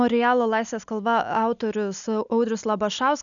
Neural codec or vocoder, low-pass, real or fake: none; 7.2 kHz; real